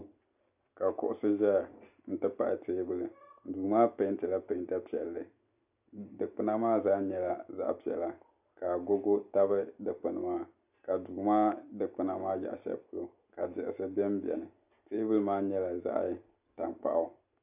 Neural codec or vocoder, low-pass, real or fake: none; 3.6 kHz; real